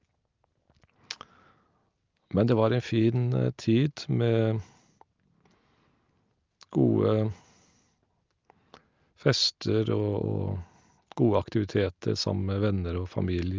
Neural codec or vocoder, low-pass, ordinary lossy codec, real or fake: none; 7.2 kHz; Opus, 24 kbps; real